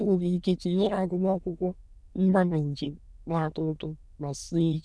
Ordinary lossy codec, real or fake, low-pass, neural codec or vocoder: none; fake; none; autoencoder, 22.05 kHz, a latent of 192 numbers a frame, VITS, trained on many speakers